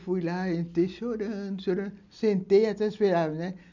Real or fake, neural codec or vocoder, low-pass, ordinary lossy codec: real; none; 7.2 kHz; none